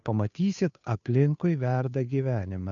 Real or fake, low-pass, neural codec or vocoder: fake; 7.2 kHz; codec, 16 kHz, 2 kbps, FunCodec, trained on Chinese and English, 25 frames a second